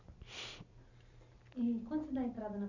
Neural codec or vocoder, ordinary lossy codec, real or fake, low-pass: none; none; real; 7.2 kHz